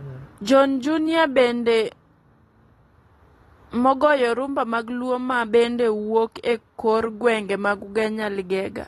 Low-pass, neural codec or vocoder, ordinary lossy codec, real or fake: 19.8 kHz; none; AAC, 32 kbps; real